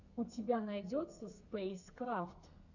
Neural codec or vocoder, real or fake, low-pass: codec, 32 kHz, 1.9 kbps, SNAC; fake; 7.2 kHz